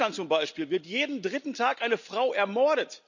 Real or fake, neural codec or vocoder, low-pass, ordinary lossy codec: real; none; 7.2 kHz; none